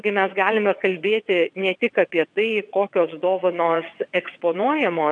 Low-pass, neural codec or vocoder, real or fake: 9.9 kHz; vocoder, 22.05 kHz, 80 mel bands, WaveNeXt; fake